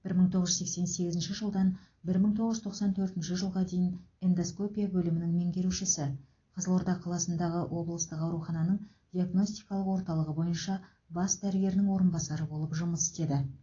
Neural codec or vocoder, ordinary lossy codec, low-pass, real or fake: none; AAC, 32 kbps; 7.2 kHz; real